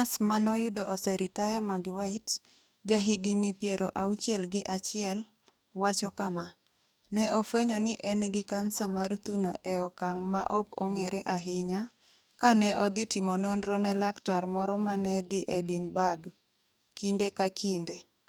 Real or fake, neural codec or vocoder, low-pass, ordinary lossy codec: fake; codec, 44.1 kHz, 2.6 kbps, DAC; none; none